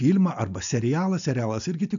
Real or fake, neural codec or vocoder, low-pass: real; none; 7.2 kHz